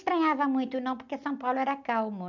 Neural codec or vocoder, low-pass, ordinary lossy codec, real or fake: none; 7.2 kHz; none; real